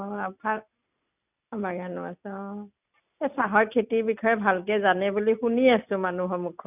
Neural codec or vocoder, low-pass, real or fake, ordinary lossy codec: none; 3.6 kHz; real; none